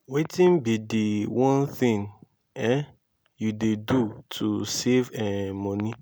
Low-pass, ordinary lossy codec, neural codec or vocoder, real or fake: none; none; none; real